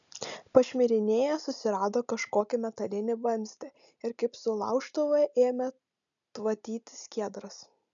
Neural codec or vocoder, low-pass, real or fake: none; 7.2 kHz; real